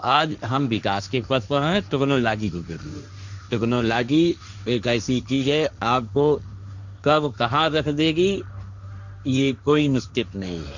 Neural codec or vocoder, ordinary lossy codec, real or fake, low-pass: codec, 16 kHz, 1.1 kbps, Voila-Tokenizer; none; fake; 7.2 kHz